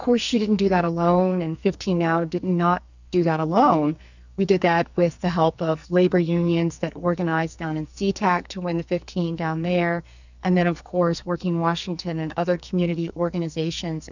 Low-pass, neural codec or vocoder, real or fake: 7.2 kHz; codec, 44.1 kHz, 2.6 kbps, SNAC; fake